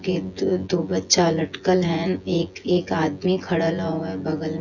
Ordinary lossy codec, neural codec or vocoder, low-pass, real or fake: none; vocoder, 24 kHz, 100 mel bands, Vocos; 7.2 kHz; fake